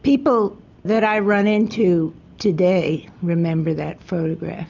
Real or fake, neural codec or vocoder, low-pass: real; none; 7.2 kHz